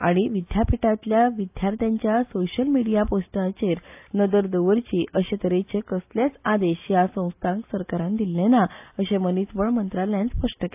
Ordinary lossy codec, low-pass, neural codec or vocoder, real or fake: AAC, 32 kbps; 3.6 kHz; none; real